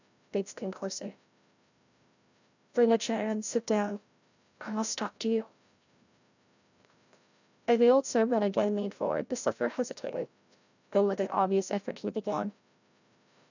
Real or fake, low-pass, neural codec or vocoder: fake; 7.2 kHz; codec, 16 kHz, 0.5 kbps, FreqCodec, larger model